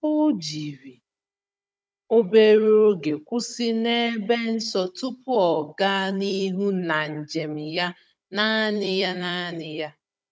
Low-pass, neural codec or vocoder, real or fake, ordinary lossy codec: none; codec, 16 kHz, 16 kbps, FunCodec, trained on Chinese and English, 50 frames a second; fake; none